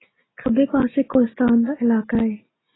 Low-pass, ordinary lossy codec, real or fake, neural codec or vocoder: 7.2 kHz; AAC, 16 kbps; real; none